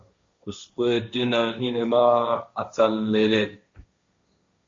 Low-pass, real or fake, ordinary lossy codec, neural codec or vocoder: 7.2 kHz; fake; MP3, 48 kbps; codec, 16 kHz, 1.1 kbps, Voila-Tokenizer